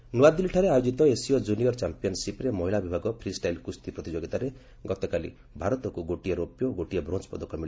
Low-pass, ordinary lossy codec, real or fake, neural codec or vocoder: none; none; real; none